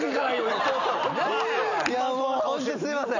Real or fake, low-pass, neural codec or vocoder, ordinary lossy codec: real; 7.2 kHz; none; none